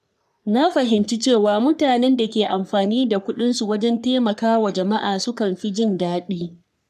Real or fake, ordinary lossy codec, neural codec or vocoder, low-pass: fake; none; codec, 44.1 kHz, 3.4 kbps, Pupu-Codec; 14.4 kHz